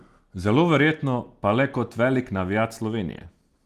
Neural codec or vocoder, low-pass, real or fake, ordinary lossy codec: none; 14.4 kHz; real; Opus, 24 kbps